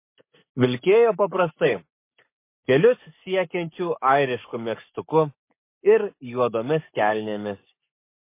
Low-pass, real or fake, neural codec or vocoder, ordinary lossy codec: 3.6 kHz; fake; codec, 44.1 kHz, 7.8 kbps, Pupu-Codec; MP3, 24 kbps